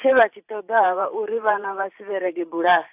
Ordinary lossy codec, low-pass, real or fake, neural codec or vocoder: AAC, 32 kbps; 3.6 kHz; fake; vocoder, 44.1 kHz, 128 mel bands every 512 samples, BigVGAN v2